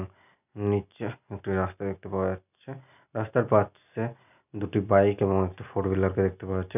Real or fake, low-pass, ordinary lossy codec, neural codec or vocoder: real; 3.6 kHz; none; none